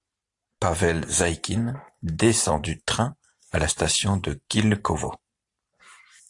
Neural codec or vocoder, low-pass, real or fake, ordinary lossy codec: vocoder, 44.1 kHz, 128 mel bands every 512 samples, BigVGAN v2; 10.8 kHz; fake; AAC, 64 kbps